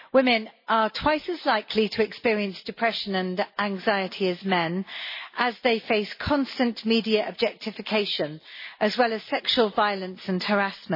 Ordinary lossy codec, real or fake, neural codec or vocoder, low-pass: MP3, 24 kbps; real; none; 5.4 kHz